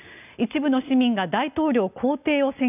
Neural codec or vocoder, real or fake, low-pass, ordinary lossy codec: none; real; 3.6 kHz; none